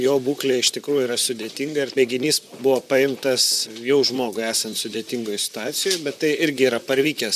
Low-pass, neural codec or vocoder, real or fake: 14.4 kHz; vocoder, 44.1 kHz, 128 mel bands, Pupu-Vocoder; fake